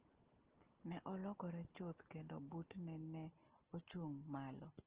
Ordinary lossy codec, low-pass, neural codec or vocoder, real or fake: Opus, 16 kbps; 3.6 kHz; none; real